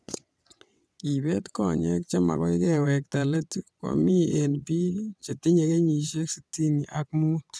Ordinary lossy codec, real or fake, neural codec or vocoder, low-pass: none; fake; vocoder, 22.05 kHz, 80 mel bands, WaveNeXt; none